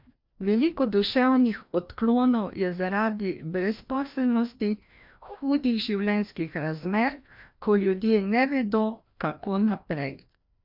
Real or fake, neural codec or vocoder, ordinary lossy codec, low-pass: fake; codec, 16 kHz, 1 kbps, FreqCodec, larger model; MP3, 48 kbps; 5.4 kHz